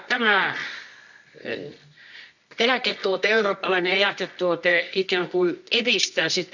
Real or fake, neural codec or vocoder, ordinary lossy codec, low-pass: fake; codec, 24 kHz, 0.9 kbps, WavTokenizer, medium music audio release; none; 7.2 kHz